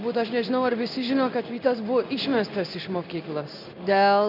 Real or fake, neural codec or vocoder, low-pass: fake; codec, 16 kHz in and 24 kHz out, 1 kbps, XY-Tokenizer; 5.4 kHz